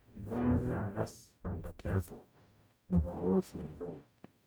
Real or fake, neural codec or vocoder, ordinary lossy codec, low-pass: fake; codec, 44.1 kHz, 0.9 kbps, DAC; none; none